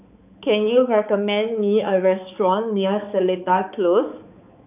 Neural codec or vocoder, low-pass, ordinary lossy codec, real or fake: codec, 16 kHz, 4 kbps, X-Codec, HuBERT features, trained on balanced general audio; 3.6 kHz; none; fake